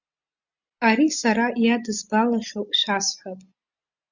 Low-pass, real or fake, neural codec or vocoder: 7.2 kHz; real; none